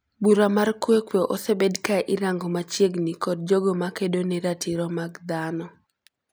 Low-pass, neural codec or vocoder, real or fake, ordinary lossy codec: none; none; real; none